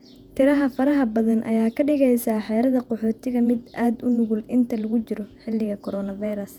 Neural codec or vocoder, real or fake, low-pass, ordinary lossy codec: vocoder, 48 kHz, 128 mel bands, Vocos; fake; 19.8 kHz; none